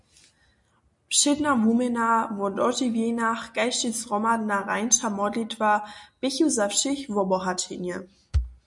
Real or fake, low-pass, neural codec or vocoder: real; 10.8 kHz; none